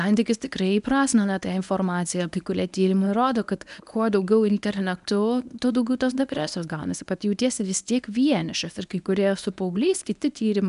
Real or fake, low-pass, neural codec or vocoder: fake; 10.8 kHz; codec, 24 kHz, 0.9 kbps, WavTokenizer, medium speech release version 1